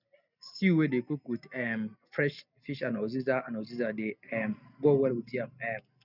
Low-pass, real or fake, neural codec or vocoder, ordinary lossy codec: 5.4 kHz; real; none; none